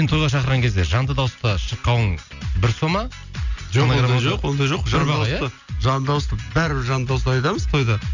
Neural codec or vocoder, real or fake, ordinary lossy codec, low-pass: none; real; none; 7.2 kHz